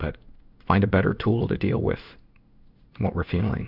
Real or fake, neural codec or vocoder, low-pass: fake; codec, 16 kHz in and 24 kHz out, 1 kbps, XY-Tokenizer; 5.4 kHz